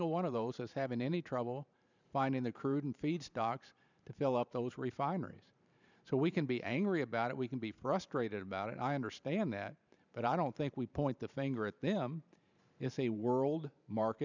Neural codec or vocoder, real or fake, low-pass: vocoder, 44.1 kHz, 128 mel bands every 512 samples, BigVGAN v2; fake; 7.2 kHz